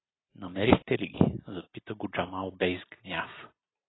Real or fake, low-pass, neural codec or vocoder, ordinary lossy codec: real; 7.2 kHz; none; AAC, 16 kbps